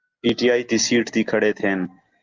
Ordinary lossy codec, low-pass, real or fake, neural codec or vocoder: Opus, 24 kbps; 7.2 kHz; real; none